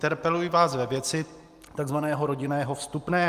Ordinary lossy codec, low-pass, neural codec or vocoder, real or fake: Opus, 32 kbps; 14.4 kHz; none; real